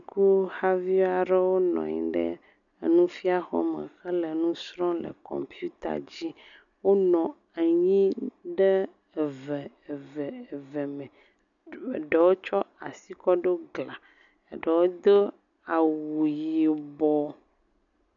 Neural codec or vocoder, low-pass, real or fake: none; 7.2 kHz; real